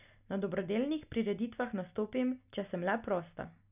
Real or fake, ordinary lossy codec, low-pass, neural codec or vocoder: real; none; 3.6 kHz; none